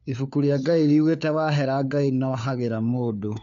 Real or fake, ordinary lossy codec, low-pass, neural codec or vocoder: fake; MP3, 64 kbps; 7.2 kHz; codec, 16 kHz, 4 kbps, FunCodec, trained on LibriTTS, 50 frames a second